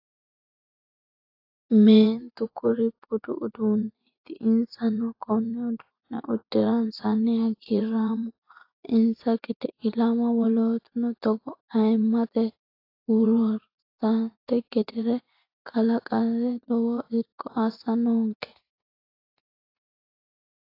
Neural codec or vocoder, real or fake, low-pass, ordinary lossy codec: vocoder, 24 kHz, 100 mel bands, Vocos; fake; 5.4 kHz; AAC, 32 kbps